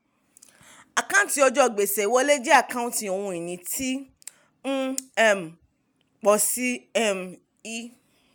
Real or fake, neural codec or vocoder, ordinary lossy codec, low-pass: real; none; none; none